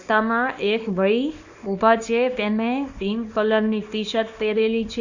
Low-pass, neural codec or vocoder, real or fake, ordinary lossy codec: 7.2 kHz; codec, 24 kHz, 0.9 kbps, WavTokenizer, small release; fake; none